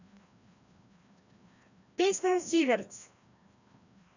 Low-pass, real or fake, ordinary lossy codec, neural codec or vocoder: 7.2 kHz; fake; none; codec, 16 kHz, 1 kbps, FreqCodec, larger model